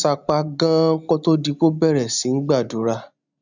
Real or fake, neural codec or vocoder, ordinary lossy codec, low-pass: real; none; none; 7.2 kHz